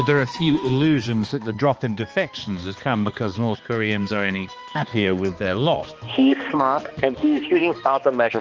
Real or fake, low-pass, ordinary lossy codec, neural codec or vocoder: fake; 7.2 kHz; Opus, 24 kbps; codec, 16 kHz, 2 kbps, X-Codec, HuBERT features, trained on balanced general audio